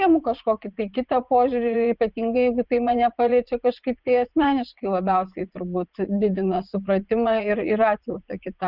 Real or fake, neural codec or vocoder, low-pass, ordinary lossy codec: fake; vocoder, 44.1 kHz, 80 mel bands, Vocos; 5.4 kHz; Opus, 24 kbps